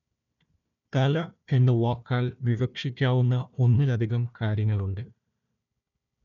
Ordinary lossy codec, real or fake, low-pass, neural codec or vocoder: none; fake; 7.2 kHz; codec, 16 kHz, 1 kbps, FunCodec, trained on Chinese and English, 50 frames a second